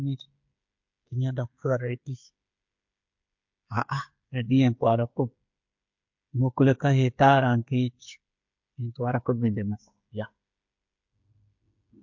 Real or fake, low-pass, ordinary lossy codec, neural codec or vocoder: fake; 7.2 kHz; MP3, 48 kbps; codec, 16 kHz, 8 kbps, FreqCodec, smaller model